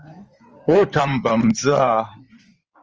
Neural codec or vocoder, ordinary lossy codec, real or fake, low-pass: codec, 16 kHz in and 24 kHz out, 2.2 kbps, FireRedTTS-2 codec; Opus, 24 kbps; fake; 7.2 kHz